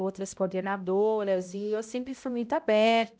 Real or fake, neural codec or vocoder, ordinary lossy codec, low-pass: fake; codec, 16 kHz, 0.5 kbps, X-Codec, HuBERT features, trained on balanced general audio; none; none